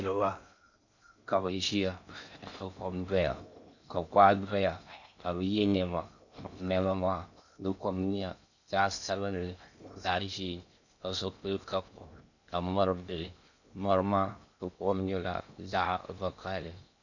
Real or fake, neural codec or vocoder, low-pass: fake; codec, 16 kHz in and 24 kHz out, 0.6 kbps, FocalCodec, streaming, 2048 codes; 7.2 kHz